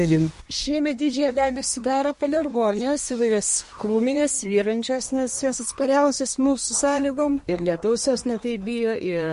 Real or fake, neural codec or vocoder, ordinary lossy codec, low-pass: fake; codec, 24 kHz, 1 kbps, SNAC; MP3, 48 kbps; 10.8 kHz